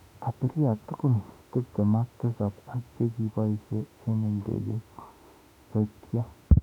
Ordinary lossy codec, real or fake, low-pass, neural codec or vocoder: none; fake; 19.8 kHz; autoencoder, 48 kHz, 32 numbers a frame, DAC-VAE, trained on Japanese speech